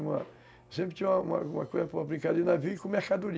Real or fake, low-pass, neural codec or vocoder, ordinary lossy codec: real; none; none; none